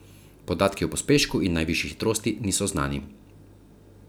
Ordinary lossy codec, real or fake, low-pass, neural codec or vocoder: none; real; none; none